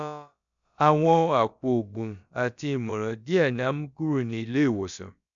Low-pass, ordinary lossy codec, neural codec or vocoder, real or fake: 7.2 kHz; none; codec, 16 kHz, about 1 kbps, DyCAST, with the encoder's durations; fake